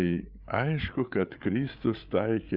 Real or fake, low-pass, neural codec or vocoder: fake; 5.4 kHz; codec, 16 kHz, 8 kbps, FreqCodec, larger model